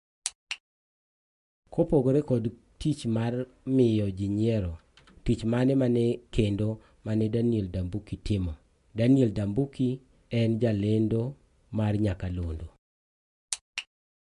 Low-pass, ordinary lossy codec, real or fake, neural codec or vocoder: 10.8 kHz; MP3, 64 kbps; real; none